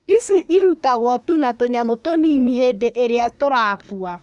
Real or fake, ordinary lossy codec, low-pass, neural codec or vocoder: fake; none; 10.8 kHz; codec, 24 kHz, 1 kbps, SNAC